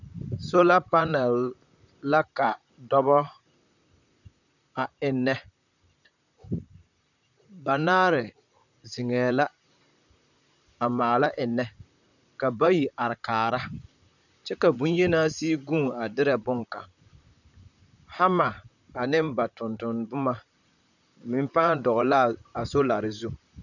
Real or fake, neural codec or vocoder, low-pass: fake; vocoder, 44.1 kHz, 128 mel bands, Pupu-Vocoder; 7.2 kHz